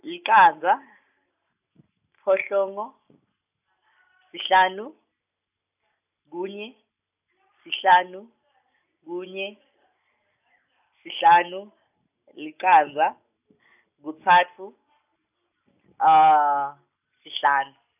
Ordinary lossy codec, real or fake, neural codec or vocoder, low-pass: none; real; none; 3.6 kHz